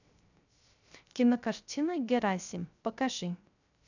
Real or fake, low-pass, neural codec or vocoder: fake; 7.2 kHz; codec, 16 kHz, 0.3 kbps, FocalCodec